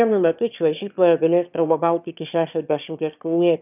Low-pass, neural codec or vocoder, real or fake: 3.6 kHz; autoencoder, 22.05 kHz, a latent of 192 numbers a frame, VITS, trained on one speaker; fake